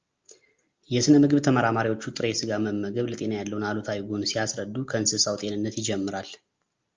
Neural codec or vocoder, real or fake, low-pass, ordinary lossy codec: none; real; 7.2 kHz; Opus, 24 kbps